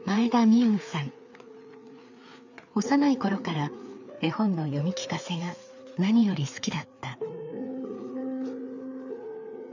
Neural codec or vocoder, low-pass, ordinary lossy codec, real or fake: codec, 16 kHz, 4 kbps, FreqCodec, larger model; 7.2 kHz; none; fake